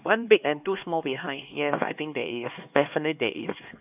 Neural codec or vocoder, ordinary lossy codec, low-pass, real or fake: codec, 16 kHz, 2 kbps, X-Codec, HuBERT features, trained on LibriSpeech; AAC, 32 kbps; 3.6 kHz; fake